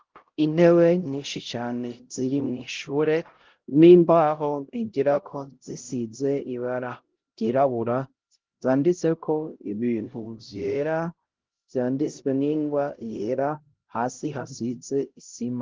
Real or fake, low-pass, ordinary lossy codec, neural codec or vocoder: fake; 7.2 kHz; Opus, 16 kbps; codec, 16 kHz, 0.5 kbps, X-Codec, HuBERT features, trained on LibriSpeech